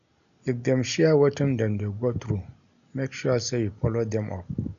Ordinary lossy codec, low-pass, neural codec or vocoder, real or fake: none; 7.2 kHz; none; real